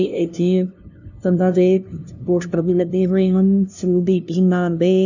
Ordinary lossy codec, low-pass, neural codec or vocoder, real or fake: none; 7.2 kHz; codec, 16 kHz, 0.5 kbps, FunCodec, trained on LibriTTS, 25 frames a second; fake